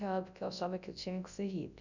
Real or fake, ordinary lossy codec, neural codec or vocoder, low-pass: fake; none; codec, 24 kHz, 0.9 kbps, WavTokenizer, large speech release; 7.2 kHz